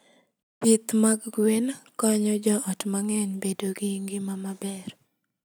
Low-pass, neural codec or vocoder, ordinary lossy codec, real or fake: none; none; none; real